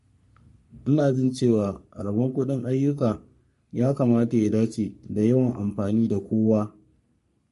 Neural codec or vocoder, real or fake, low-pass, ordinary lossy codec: codec, 44.1 kHz, 3.4 kbps, Pupu-Codec; fake; 14.4 kHz; MP3, 48 kbps